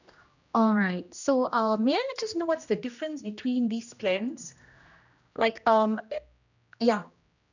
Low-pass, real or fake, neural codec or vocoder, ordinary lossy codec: 7.2 kHz; fake; codec, 16 kHz, 1 kbps, X-Codec, HuBERT features, trained on general audio; none